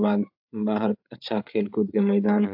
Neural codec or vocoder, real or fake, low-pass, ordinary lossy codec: codec, 16 kHz, 8 kbps, FreqCodec, larger model; fake; 5.4 kHz; AAC, 48 kbps